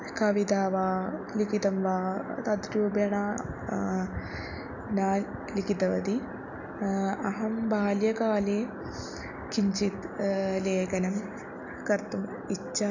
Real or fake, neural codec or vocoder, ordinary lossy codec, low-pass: real; none; none; 7.2 kHz